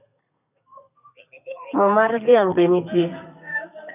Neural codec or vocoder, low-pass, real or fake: codec, 32 kHz, 1.9 kbps, SNAC; 3.6 kHz; fake